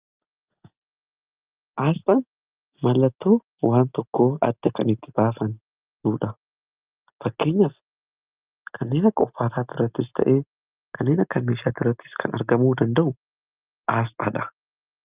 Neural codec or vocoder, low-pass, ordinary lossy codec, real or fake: none; 3.6 kHz; Opus, 24 kbps; real